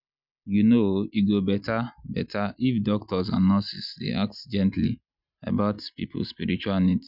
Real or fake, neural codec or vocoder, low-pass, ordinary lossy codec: real; none; 5.4 kHz; none